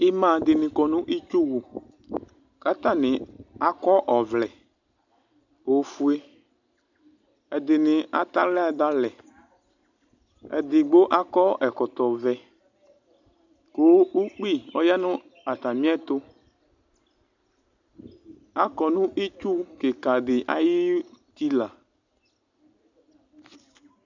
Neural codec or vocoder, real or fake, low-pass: none; real; 7.2 kHz